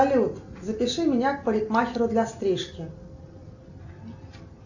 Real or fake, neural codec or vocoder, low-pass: real; none; 7.2 kHz